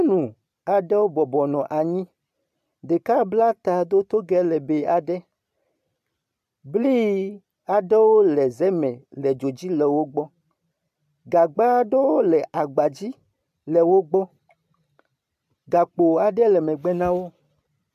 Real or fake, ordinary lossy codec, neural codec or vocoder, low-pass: real; AAC, 96 kbps; none; 14.4 kHz